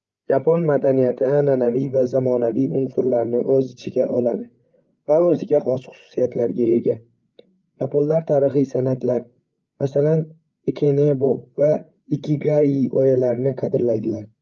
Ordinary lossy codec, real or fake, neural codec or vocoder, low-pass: Opus, 24 kbps; fake; codec, 16 kHz, 8 kbps, FreqCodec, larger model; 7.2 kHz